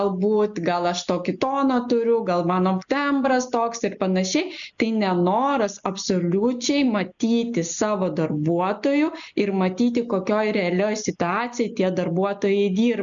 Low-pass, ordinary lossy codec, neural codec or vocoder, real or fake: 7.2 kHz; MP3, 96 kbps; none; real